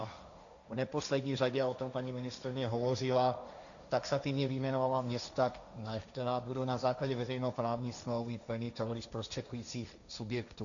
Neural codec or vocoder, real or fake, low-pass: codec, 16 kHz, 1.1 kbps, Voila-Tokenizer; fake; 7.2 kHz